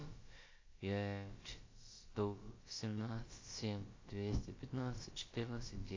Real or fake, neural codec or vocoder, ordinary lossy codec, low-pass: fake; codec, 16 kHz, about 1 kbps, DyCAST, with the encoder's durations; AAC, 32 kbps; 7.2 kHz